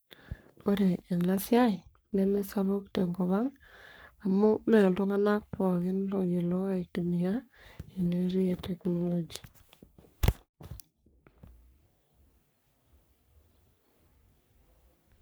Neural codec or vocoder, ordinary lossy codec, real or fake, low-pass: codec, 44.1 kHz, 3.4 kbps, Pupu-Codec; none; fake; none